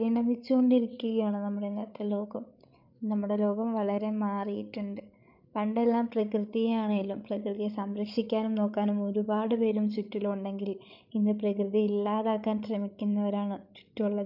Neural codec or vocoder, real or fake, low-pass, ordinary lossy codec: codec, 16 kHz, 8 kbps, FreqCodec, larger model; fake; 5.4 kHz; none